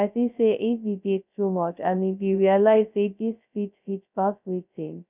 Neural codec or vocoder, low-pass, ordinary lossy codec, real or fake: codec, 16 kHz, 0.2 kbps, FocalCodec; 3.6 kHz; none; fake